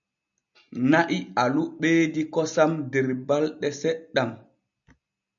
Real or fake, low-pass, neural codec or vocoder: real; 7.2 kHz; none